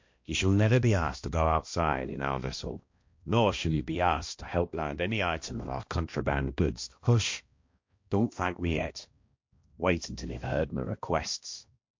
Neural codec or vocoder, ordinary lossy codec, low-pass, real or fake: codec, 16 kHz, 1 kbps, X-Codec, HuBERT features, trained on balanced general audio; MP3, 48 kbps; 7.2 kHz; fake